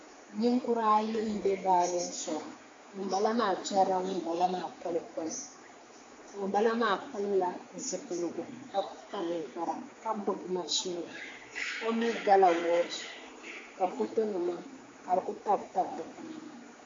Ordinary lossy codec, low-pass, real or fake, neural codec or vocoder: AAC, 32 kbps; 7.2 kHz; fake; codec, 16 kHz, 4 kbps, X-Codec, HuBERT features, trained on general audio